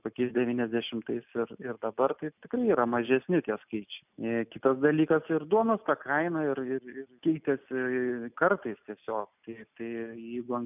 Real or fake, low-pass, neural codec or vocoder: real; 3.6 kHz; none